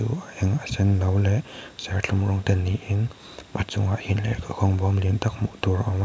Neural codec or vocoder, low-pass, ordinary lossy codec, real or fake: none; none; none; real